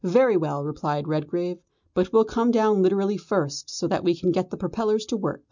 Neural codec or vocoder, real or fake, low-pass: none; real; 7.2 kHz